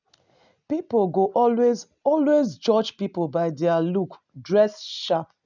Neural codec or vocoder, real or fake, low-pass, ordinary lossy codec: none; real; 7.2 kHz; none